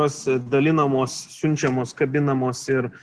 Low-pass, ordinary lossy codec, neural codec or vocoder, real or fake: 10.8 kHz; Opus, 16 kbps; none; real